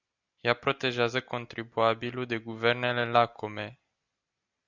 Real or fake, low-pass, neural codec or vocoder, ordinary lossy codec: real; 7.2 kHz; none; Opus, 64 kbps